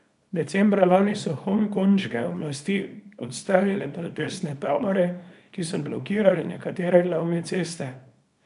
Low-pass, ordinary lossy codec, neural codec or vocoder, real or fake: 10.8 kHz; MP3, 96 kbps; codec, 24 kHz, 0.9 kbps, WavTokenizer, small release; fake